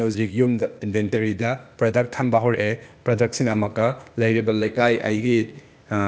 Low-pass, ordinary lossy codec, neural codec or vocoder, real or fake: none; none; codec, 16 kHz, 0.8 kbps, ZipCodec; fake